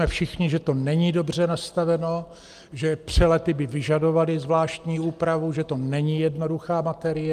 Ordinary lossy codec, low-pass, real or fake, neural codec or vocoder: Opus, 32 kbps; 14.4 kHz; real; none